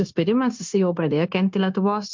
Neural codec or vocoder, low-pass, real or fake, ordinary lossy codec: codec, 16 kHz, 0.9 kbps, LongCat-Audio-Codec; 7.2 kHz; fake; MP3, 64 kbps